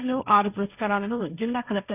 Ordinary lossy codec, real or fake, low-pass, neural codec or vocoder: none; fake; 3.6 kHz; codec, 16 kHz, 1.1 kbps, Voila-Tokenizer